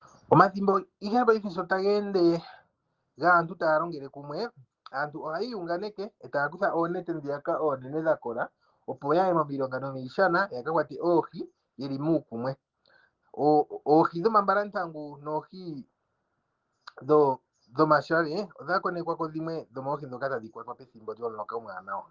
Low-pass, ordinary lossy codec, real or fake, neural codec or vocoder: 7.2 kHz; Opus, 16 kbps; real; none